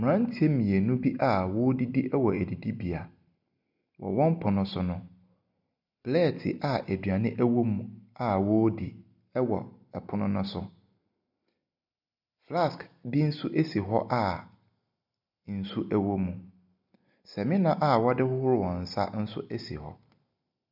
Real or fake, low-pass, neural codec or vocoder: real; 5.4 kHz; none